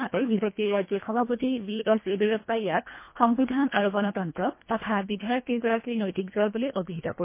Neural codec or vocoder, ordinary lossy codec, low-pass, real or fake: codec, 24 kHz, 1.5 kbps, HILCodec; MP3, 24 kbps; 3.6 kHz; fake